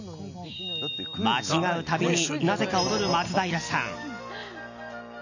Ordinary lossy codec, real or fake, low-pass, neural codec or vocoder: none; real; 7.2 kHz; none